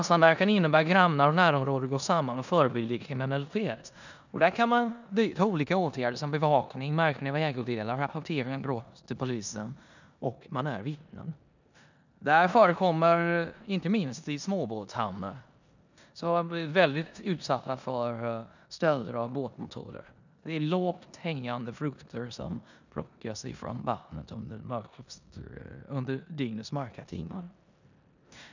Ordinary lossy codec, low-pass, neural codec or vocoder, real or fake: none; 7.2 kHz; codec, 16 kHz in and 24 kHz out, 0.9 kbps, LongCat-Audio-Codec, four codebook decoder; fake